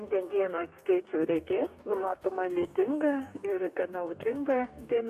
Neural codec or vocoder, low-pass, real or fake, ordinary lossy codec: codec, 44.1 kHz, 2.6 kbps, DAC; 14.4 kHz; fake; MP3, 64 kbps